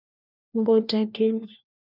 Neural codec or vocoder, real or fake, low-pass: codec, 16 kHz, 1 kbps, FunCodec, trained on LibriTTS, 50 frames a second; fake; 5.4 kHz